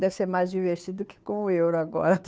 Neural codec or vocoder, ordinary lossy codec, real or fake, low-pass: codec, 16 kHz, 2 kbps, FunCodec, trained on Chinese and English, 25 frames a second; none; fake; none